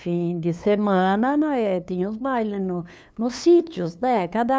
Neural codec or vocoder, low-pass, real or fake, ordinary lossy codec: codec, 16 kHz, 2 kbps, FunCodec, trained on LibriTTS, 25 frames a second; none; fake; none